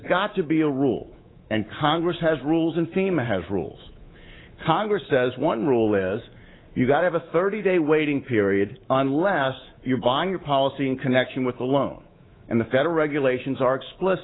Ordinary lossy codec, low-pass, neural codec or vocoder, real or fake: AAC, 16 kbps; 7.2 kHz; codec, 24 kHz, 3.1 kbps, DualCodec; fake